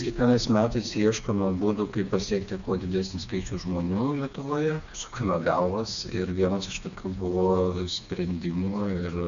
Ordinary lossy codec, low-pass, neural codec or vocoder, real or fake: AAC, 96 kbps; 7.2 kHz; codec, 16 kHz, 2 kbps, FreqCodec, smaller model; fake